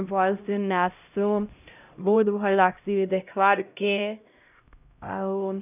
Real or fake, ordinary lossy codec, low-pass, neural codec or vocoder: fake; none; 3.6 kHz; codec, 16 kHz, 0.5 kbps, X-Codec, HuBERT features, trained on LibriSpeech